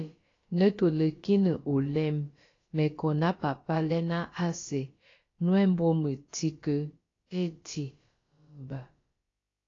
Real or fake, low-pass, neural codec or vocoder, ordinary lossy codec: fake; 7.2 kHz; codec, 16 kHz, about 1 kbps, DyCAST, with the encoder's durations; AAC, 32 kbps